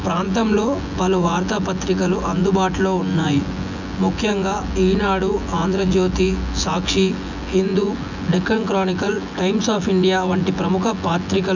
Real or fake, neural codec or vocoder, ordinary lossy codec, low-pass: fake; vocoder, 24 kHz, 100 mel bands, Vocos; none; 7.2 kHz